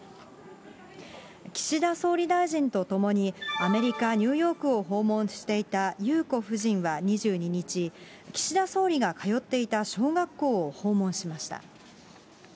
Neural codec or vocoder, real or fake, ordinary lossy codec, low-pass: none; real; none; none